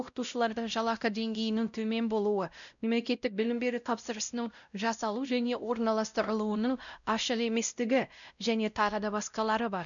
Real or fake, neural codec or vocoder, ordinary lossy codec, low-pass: fake; codec, 16 kHz, 0.5 kbps, X-Codec, WavLM features, trained on Multilingual LibriSpeech; none; 7.2 kHz